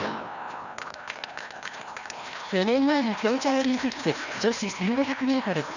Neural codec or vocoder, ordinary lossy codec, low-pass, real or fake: codec, 16 kHz, 1 kbps, FreqCodec, larger model; none; 7.2 kHz; fake